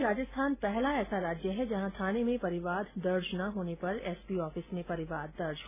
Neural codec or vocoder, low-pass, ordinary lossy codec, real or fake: none; 3.6 kHz; none; real